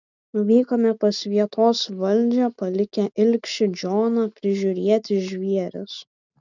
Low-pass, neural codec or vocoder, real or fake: 7.2 kHz; none; real